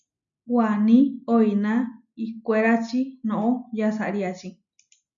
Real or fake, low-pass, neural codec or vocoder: real; 7.2 kHz; none